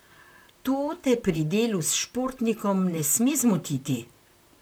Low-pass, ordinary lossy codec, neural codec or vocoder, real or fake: none; none; vocoder, 44.1 kHz, 128 mel bands, Pupu-Vocoder; fake